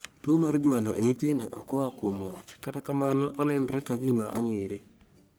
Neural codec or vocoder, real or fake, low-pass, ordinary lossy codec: codec, 44.1 kHz, 1.7 kbps, Pupu-Codec; fake; none; none